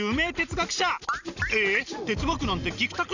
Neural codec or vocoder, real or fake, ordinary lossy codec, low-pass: none; real; none; 7.2 kHz